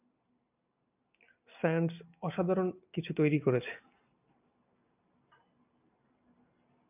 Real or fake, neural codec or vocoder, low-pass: real; none; 3.6 kHz